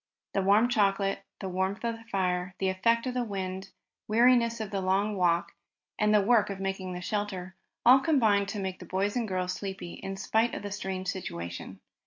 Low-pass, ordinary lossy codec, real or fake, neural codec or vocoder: 7.2 kHz; AAC, 48 kbps; real; none